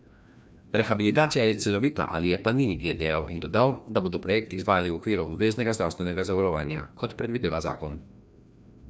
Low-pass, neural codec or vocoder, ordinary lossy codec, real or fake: none; codec, 16 kHz, 1 kbps, FreqCodec, larger model; none; fake